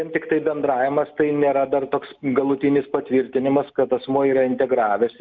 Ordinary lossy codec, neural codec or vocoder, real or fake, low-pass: Opus, 24 kbps; none; real; 7.2 kHz